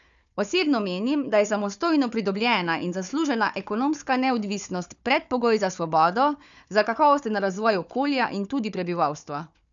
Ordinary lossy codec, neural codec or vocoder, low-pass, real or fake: none; codec, 16 kHz, 4 kbps, FunCodec, trained on Chinese and English, 50 frames a second; 7.2 kHz; fake